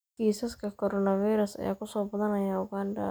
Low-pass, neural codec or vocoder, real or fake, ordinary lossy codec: none; none; real; none